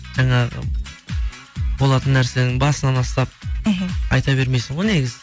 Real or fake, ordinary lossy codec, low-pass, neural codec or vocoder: real; none; none; none